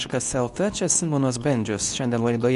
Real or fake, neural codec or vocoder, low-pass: fake; codec, 24 kHz, 0.9 kbps, WavTokenizer, medium speech release version 2; 10.8 kHz